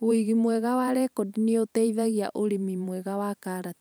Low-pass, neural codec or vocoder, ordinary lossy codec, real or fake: none; vocoder, 44.1 kHz, 128 mel bands every 512 samples, BigVGAN v2; none; fake